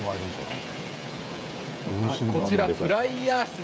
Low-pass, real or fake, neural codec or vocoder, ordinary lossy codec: none; fake; codec, 16 kHz, 16 kbps, FreqCodec, smaller model; none